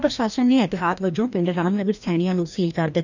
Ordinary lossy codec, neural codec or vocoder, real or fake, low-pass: none; codec, 16 kHz, 1 kbps, FreqCodec, larger model; fake; 7.2 kHz